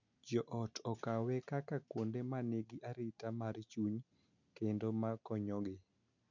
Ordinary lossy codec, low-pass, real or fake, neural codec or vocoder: none; 7.2 kHz; real; none